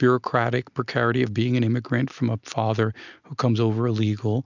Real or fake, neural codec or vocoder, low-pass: real; none; 7.2 kHz